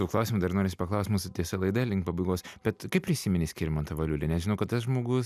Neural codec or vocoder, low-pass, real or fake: none; 14.4 kHz; real